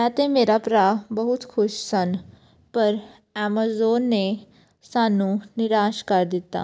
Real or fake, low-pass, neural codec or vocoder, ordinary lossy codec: real; none; none; none